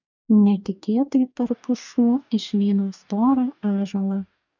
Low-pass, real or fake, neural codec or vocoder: 7.2 kHz; fake; codec, 44.1 kHz, 2.6 kbps, DAC